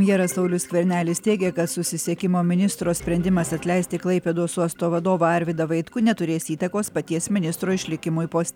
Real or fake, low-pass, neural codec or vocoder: real; 19.8 kHz; none